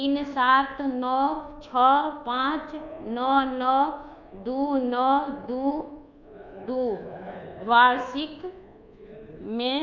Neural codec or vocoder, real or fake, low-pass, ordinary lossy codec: codec, 24 kHz, 1.2 kbps, DualCodec; fake; 7.2 kHz; none